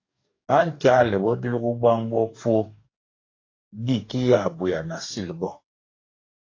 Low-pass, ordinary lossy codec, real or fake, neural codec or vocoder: 7.2 kHz; AAC, 32 kbps; fake; codec, 44.1 kHz, 2.6 kbps, DAC